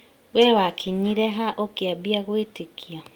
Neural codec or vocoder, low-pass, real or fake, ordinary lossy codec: none; 19.8 kHz; real; Opus, 32 kbps